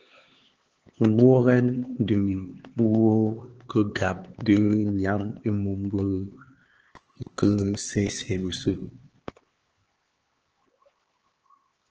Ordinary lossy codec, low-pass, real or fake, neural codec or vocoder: Opus, 16 kbps; 7.2 kHz; fake; codec, 16 kHz, 4 kbps, X-Codec, HuBERT features, trained on LibriSpeech